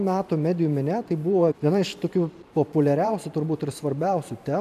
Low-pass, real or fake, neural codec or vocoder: 14.4 kHz; fake; vocoder, 44.1 kHz, 128 mel bands every 512 samples, BigVGAN v2